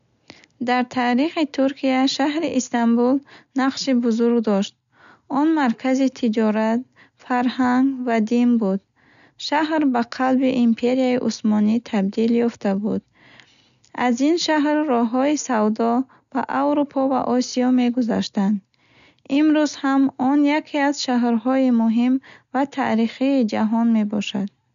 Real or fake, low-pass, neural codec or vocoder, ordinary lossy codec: real; 7.2 kHz; none; none